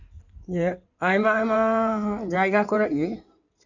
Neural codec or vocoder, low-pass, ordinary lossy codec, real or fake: codec, 16 kHz in and 24 kHz out, 2.2 kbps, FireRedTTS-2 codec; 7.2 kHz; none; fake